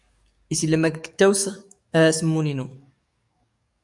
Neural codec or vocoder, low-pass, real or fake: codec, 44.1 kHz, 7.8 kbps, DAC; 10.8 kHz; fake